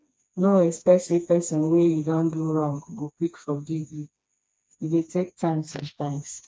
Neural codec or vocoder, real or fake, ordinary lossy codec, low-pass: codec, 16 kHz, 2 kbps, FreqCodec, smaller model; fake; none; none